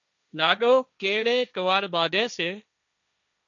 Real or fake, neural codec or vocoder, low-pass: fake; codec, 16 kHz, 1.1 kbps, Voila-Tokenizer; 7.2 kHz